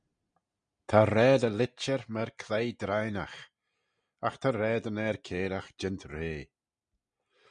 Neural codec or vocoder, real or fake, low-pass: none; real; 9.9 kHz